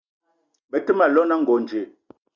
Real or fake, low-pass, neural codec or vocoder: real; 7.2 kHz; none